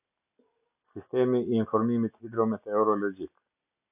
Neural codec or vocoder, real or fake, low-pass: none; real; 3.6 kHz